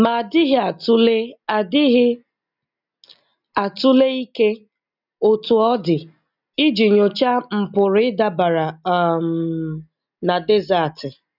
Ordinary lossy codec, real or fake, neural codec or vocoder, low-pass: none; real; none; 5.4 kHz